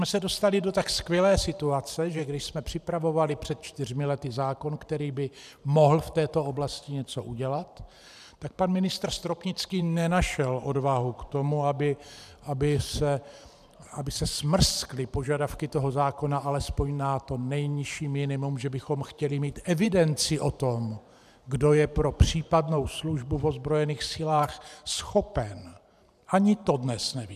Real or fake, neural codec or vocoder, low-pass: fake; vocoder, 44.1 kHz, 128 mel bands every 512 samples, BigVGAN v2; 14.4 kHz